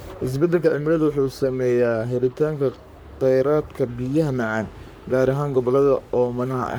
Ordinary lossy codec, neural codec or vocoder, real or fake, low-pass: none; codec, 44.1 kHz, 3.4 kbps, Pupu-Codec; fake; none